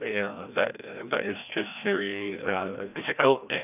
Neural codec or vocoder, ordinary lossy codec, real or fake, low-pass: codec, 16 kHz, 1 kbps, FreqCodec, larger model; none; fake; 3.6 kHz